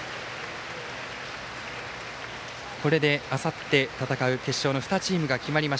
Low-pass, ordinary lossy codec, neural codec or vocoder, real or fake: none; none; none; real